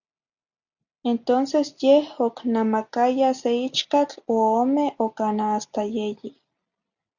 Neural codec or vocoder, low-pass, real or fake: none; 7.2 kHz; real